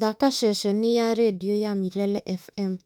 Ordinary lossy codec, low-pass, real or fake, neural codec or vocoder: none; 19.8 kHz; fake; autoencoder, 48 kHz, 32 numbers a frame, DAC-VAE, trained on Japanese speech